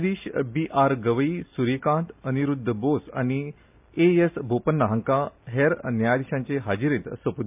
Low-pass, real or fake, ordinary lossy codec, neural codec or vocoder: 3.6 kHz; real; MP3, 32 kbps; none